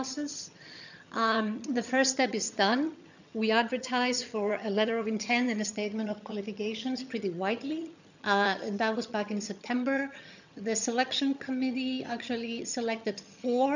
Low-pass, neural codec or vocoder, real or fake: 7.2 kHz; vocoder, 22.05 kHz, 80 mel bands, HiFi-GAN; fake